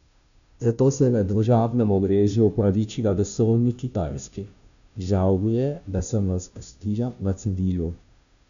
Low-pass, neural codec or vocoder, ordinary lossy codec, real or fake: 7.2 kHz; codec, 16 kHz, 0.5 kbps, FunCodec, trained on Chinese and English, 25 frames a second; none; fake